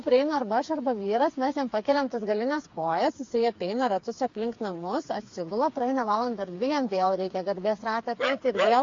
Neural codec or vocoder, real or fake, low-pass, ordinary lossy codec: codec, 16 kHz, 4 kbps, FreqCodec, smaller model; fake; 7.2 kHz; AAC, 48 kbps